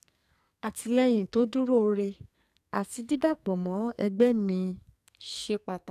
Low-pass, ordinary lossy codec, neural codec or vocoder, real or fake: 14.4 kHz; none; codec, 44.1 kHz, 2.6 kbps, SNAC; fake